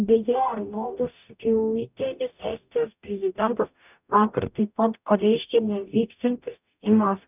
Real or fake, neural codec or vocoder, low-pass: fake; codec, 44.1 kHz, 0.9 kbps, DAC; 3.6 kHz